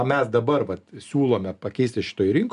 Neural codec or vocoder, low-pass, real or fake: none; 10.8 kHz; real